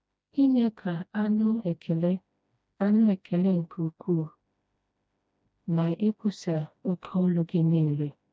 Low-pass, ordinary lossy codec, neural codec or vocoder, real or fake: none; none; codec, 16 kHz, 1 kbps, FreqCodec, smaller model; fake